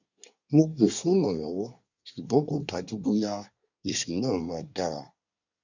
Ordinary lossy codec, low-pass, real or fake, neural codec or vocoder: none; 7.2 kHz; fake; codec, 24 kHz, 1 kbps, SNAC